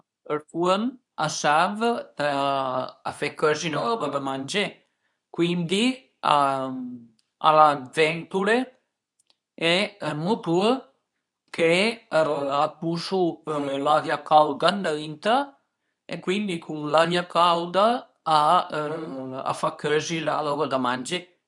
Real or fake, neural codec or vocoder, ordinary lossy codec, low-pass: fake; codec, 24 kHz, 0.9 kbps, WavTokenizer, medium speech release version 2; none; 10.8 kHz